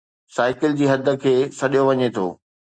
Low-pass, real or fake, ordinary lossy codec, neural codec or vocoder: 9.9 kHz; real; Opus, 64 kbps; none